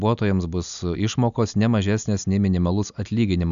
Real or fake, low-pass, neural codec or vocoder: real; 7.2 kHz; none